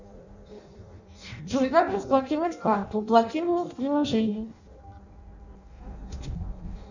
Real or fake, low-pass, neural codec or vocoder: fake; 7.2 kHz; codec, 16 kHz in and 24 kHz out, 0.6 kbps, FireRedTTS-2 codec